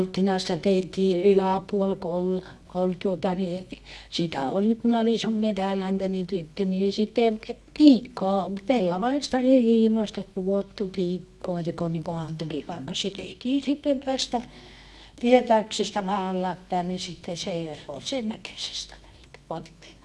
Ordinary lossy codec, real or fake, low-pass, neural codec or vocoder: none; fake; none; codec, 24 kHz, 0.9 kbps, WavTokenizer, medium music audio release